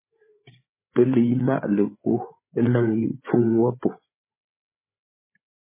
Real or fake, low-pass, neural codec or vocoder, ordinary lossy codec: fake; 3.6 kHz; codec, 16 kHz, 8 kbps, FreqCodec, larger model; MP3, 16 kbps